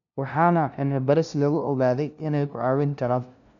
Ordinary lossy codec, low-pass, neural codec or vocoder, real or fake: none; 7.2 kHz; codec, 16 kHz, 0.5 kbps, FunCodec, trained on LibriTTS, 25 frames a second; fake